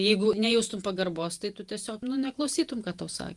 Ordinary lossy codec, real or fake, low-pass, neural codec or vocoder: Opus, 32 kbps; fake; 10.8 kHz; vocoder, 48 kHz, 128 mel bands, Vocos